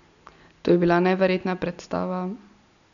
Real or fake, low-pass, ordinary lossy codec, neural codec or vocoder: real; 7.2 kHz; none; none